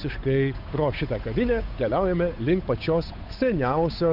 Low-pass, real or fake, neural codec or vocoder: 5.4 kHz; fake; codec, 16 kHz, 8 kbps, FunCodec, trained on Chinese and English, 25 frames a second